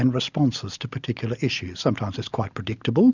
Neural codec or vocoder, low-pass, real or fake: none; 7.2 kHz; real